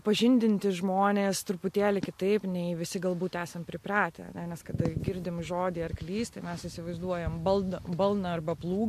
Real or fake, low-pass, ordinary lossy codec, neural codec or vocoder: real; 14.4 kHz; MP3, 64 kbps; none